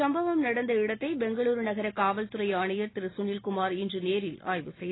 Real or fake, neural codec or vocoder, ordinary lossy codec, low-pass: real; none; AAC, 16 kbps; 7.2 kHz